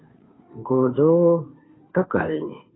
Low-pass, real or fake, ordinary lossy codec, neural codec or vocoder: 7.2 kHz; fake; AAC, 16 kbps; codec, 16 kHz, 2 kbps, FunCodec, trained on Chinese and English, 25 frames a second